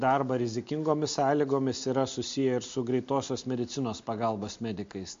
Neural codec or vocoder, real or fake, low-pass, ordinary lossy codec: none; real; 7.2 kHz; MP3, 48 kbps